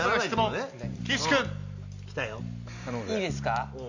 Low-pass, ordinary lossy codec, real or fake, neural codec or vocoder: 7.2 kHz; none; real; none